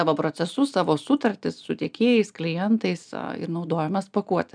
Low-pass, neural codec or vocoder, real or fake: 9.9 kHz; none; real